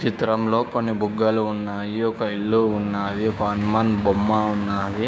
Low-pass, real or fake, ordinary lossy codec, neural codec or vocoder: none; fake; none; codec, 16 kHz, 6 kbps, DAC